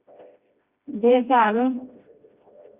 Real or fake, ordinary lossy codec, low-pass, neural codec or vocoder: fake; Opus, 64 kbps; 3.6 kHz; codec, 16 kHz, 1 kbps, FreqCodec, smaller model